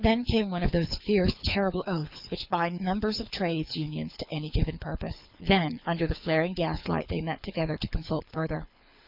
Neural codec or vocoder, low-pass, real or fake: codec, 24 kHz, 6 kbps, HILCodec; 5.4 kHz; fake